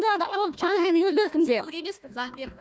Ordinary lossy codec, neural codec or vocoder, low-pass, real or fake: none; codec, 16 kHz, 1 kbps, FunCodec, trained on Chinese and English, 50 frames a second; none; fake